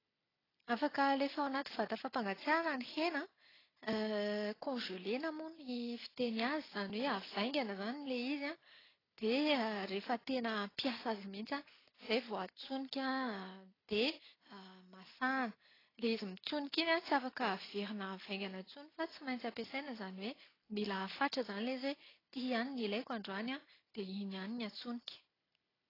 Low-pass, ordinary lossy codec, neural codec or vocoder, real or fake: 5.4 kHz; AAC, 24 kbps; none; real